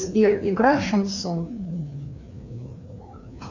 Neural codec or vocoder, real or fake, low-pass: codec, 16 kHz, 2 kbps, FreqCodec, larger model; fake; 7.2 kHz